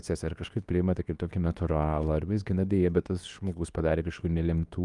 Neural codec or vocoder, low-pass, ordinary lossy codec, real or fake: codec, 24 kHz, 0.9 kbps, WavTokenizer, medium speech release version 2; 10.8 kHz; Opus, 32 kbps; fake